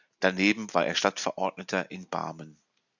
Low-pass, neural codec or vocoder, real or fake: 7.2 kHz; none; real